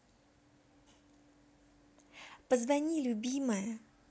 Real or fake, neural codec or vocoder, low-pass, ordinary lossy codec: real; none; none; none